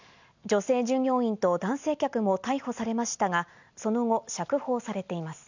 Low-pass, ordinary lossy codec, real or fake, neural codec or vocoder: 7.2 kHz; none; real; none